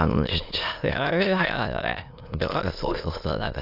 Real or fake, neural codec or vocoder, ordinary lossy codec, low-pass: fake; autoencoder, 22.05 kHz, a latent of 192 numbers a frame, VITS, trained on many speakers; AAC, 48 kbps; 5.4 kHz